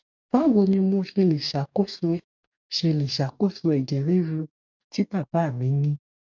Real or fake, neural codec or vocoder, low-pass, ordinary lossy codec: fake; codec, 44.1 kHz, 2.6 kbps, DAC; 7.2 kHz; none